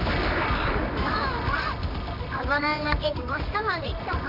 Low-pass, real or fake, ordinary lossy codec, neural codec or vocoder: 5.4 kHz; fake; none; codec, 24 kHz, 0.9 kbps, WavTokenizer, medium music audio release